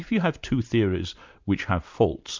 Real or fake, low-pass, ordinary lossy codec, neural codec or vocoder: real; 7.2 kHz; MP3, 64 kbps; none